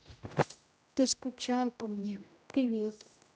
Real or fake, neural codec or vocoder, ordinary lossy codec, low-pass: fake; codec, 16 kHz, 0.5 kbps, X-Codec, HuBERT features, trained on general audio; none; none